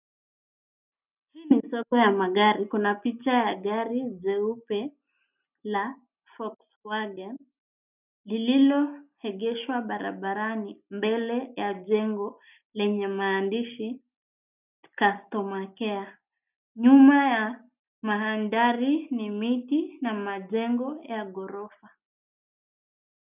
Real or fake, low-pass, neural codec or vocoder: real; 3.6 kHz; none